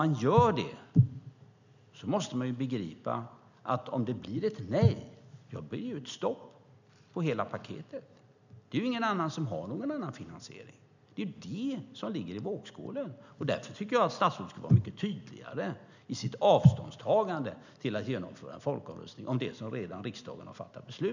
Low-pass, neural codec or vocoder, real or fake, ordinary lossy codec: 7.2 kHz; none; real; none